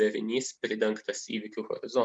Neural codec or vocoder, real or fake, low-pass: none; real; 9.9 kHz